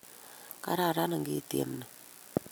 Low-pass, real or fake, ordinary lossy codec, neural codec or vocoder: none; real; none; none